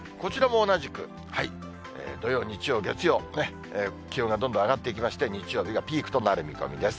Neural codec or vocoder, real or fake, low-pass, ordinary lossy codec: none; real; none; none